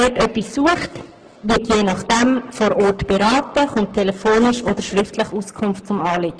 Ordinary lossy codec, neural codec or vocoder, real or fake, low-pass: Opus, 16 kbps; codec, 44.1 kHz, 7.8 kbps, Pupu-Codec; fake; 9.9 kHz